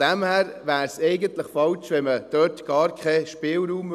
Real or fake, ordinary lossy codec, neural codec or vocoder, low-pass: real; none; none; 14.4 kHz